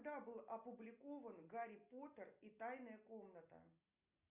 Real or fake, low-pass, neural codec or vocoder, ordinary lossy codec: real; 3.6 kHz; none; Opus, 64 kbps